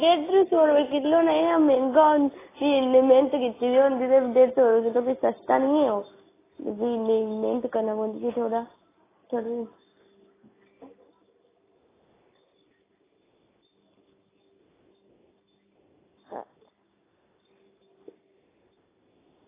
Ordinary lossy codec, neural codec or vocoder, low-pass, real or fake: AAC, 16 kbps; none; 3.6 kHz; real